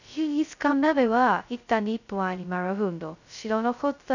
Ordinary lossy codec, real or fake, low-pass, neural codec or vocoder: none; fake; 7.2 kHz; codec, 16 kHz, 0.2 kbps, FocalCodec